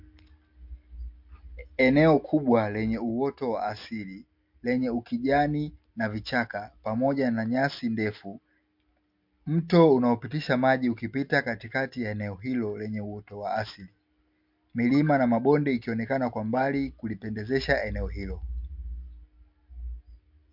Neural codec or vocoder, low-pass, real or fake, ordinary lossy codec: none; 5.4 kHz; real; MP3, 48 kbps